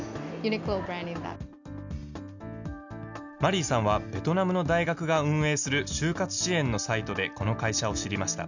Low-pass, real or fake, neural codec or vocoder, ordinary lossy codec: 7.2 kHz; real; none; none